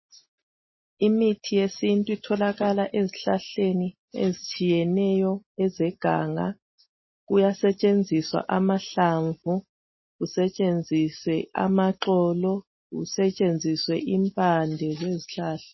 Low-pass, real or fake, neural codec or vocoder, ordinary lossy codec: 7.2 kHz; real; none; MP3, 24 kbps